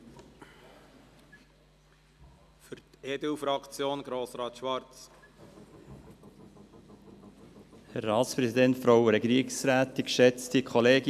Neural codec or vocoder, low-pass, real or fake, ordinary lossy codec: none; 14.4 kHz; real; none